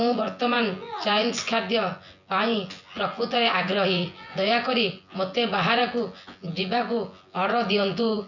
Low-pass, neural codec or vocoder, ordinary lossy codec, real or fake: 7.2 kHz; vocoder, 24 kHz, 100 mel bands, Vocos; none; fake